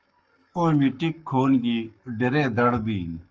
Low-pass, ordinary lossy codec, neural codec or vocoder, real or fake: 7.2 kHz; Opus, 24 kbps; codec, 44.1 kHz, 7.8 kbps, Pupu-Codec; fake